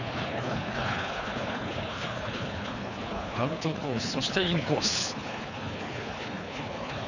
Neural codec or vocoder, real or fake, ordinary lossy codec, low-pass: codec, 24 kHz, 3 kbps, HILCodec; fake; none; 7.2 kHz